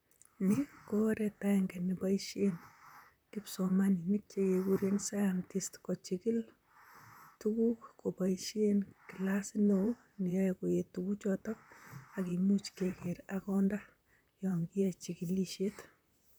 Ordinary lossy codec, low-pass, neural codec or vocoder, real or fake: none; none; vocoder, 44.1 kHz, 128 mel bands, Pupu-Vocoder; fake